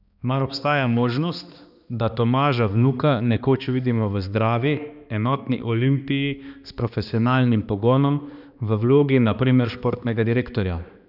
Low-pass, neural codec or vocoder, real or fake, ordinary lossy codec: 5.4 kHz; codec, 16 kHz, 2 kbps, X-Codec, HuBERT features, trained on balanced general audio; fake; none